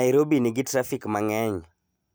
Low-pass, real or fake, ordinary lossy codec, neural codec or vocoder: none; real; none; none